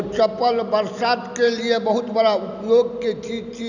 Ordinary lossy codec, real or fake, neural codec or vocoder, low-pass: none; real; none; 7.2 kHz